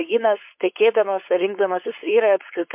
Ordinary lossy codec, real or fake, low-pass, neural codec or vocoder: MP3, 32 kbps; fake; 3.6 kHz; codec, 16 kHz, 4.8 kbps, FACodec